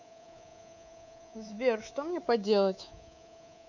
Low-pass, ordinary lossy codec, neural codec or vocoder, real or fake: 7.2 kHz; none; codec, 24 kHz, 3.1 kbps, DualCodec; fake